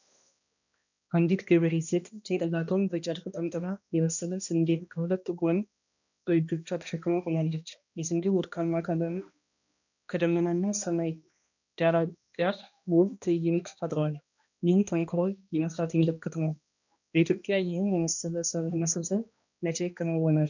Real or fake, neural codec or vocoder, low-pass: fake; codec, 16 kHz, 1 kbps, X-Codec, HuBERT features, trained on balanced general audio; 7.2 kHz